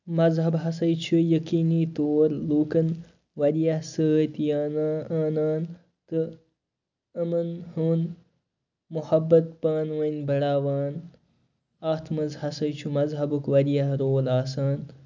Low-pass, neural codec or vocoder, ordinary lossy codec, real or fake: 7.2 kHz; none; none; real